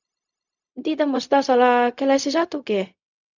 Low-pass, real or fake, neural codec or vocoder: 7.2 kHz; fake; codec, 16 kHz, 0.4 kbps, LongCat-Audio-Codec